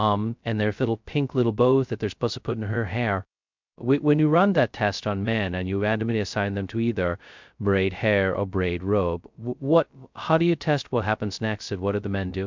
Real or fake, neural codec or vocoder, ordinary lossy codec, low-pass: fake; codec, 16 kHz, 0.2 kbps, FocalCodec; MP3, 64 kbps; 7.2 kHz